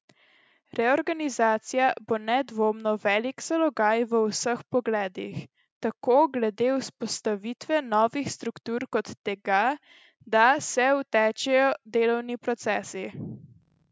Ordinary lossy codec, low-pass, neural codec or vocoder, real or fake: none; none; none; real